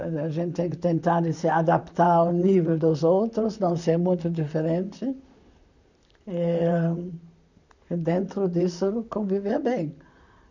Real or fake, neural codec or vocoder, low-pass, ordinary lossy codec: fake; vocoder, 44.1 kHz, 128 mel bands, Pupu-Vocoder; 7.2 kHz; none